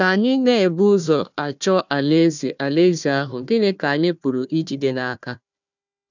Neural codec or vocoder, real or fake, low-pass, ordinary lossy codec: codec, 16 kHz, 1 kbps, FunCodec, trained on Chinese and English, 50 frames a second; fake; 7.2 kHz; none